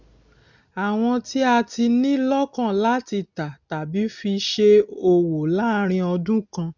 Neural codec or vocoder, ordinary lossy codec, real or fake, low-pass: none; none; real; 7.2 kHz